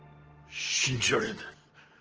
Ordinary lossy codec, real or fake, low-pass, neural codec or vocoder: Opus, 16 kbps; real; 7.2 kHz; none